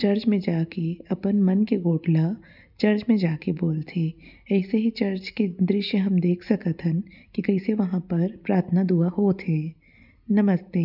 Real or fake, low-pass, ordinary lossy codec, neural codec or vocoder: real; 5.4 kHz; none; none